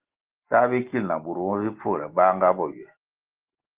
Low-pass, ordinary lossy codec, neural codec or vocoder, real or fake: 3.6 kHz; Opus, 16 kbps; none; real